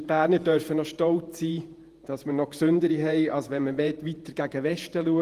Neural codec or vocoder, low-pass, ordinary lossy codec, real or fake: vocoder, 48 kHz, 128 mel bands, Vocos; 14.4 kHz; Opus, 24 kbps; fake